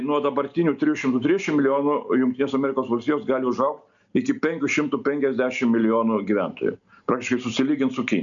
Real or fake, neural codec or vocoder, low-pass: real; none; 7.2 kHz